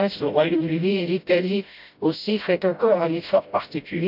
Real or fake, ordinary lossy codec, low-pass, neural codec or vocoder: fake; none; 5.4 kHz; codec, 16 kHz, 0.5 kbps, FreqCodec, smaller model